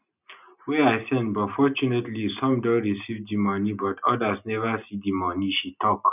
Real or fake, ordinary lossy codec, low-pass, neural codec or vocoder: real; none; 3.6 kHz; none